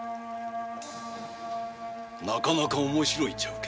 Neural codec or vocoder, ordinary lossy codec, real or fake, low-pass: none; none; real; none